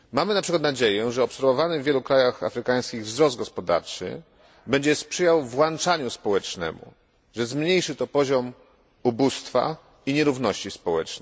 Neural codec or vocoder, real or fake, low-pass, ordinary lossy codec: none; real; none; none